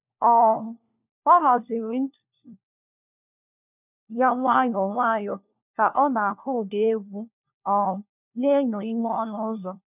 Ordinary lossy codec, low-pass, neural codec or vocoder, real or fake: none; 3.6 kHz; codec, 16 kHz, 1 kbps, FunCodec, trained on LibriTTS, 50 frames a second; fake